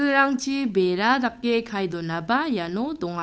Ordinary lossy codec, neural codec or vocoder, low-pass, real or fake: none; codec, 16 kHz, 8 kbps, FunCodec, trained on Chinese and English, 25 frames a second; none; fake